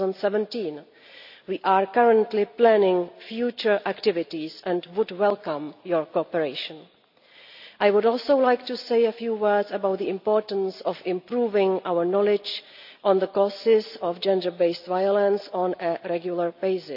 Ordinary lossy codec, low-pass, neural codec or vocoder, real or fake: none; 5.4 kHz; none; real